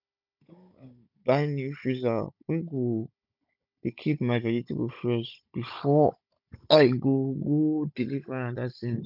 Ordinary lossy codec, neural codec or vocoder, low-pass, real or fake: none; codec, 16 kHz, 16 kbps, FunCodec, trained on Chinese and English, 50 frames a second; 5.4 kHz; fake